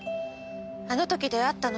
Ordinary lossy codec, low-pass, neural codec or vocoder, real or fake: none; none; none; real